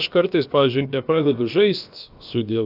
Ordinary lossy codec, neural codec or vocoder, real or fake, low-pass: AAC, 48 kbps; codec, 16 kHz, about 1 kbps, DyCAST, with the encoder's durations; fake; 5.4 kHz